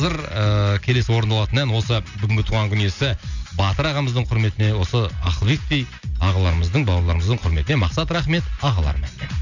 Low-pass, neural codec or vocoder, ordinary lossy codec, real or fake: 7.2 kHz; none; none; real